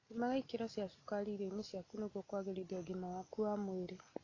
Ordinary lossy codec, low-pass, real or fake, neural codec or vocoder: AAC, 32 kbps; 7.2 kHz; real; none